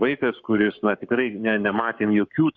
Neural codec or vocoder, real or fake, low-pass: codec, 44.1 kHz, 7.8 kbps, Pupu-Codec; fake; 7.2 kHz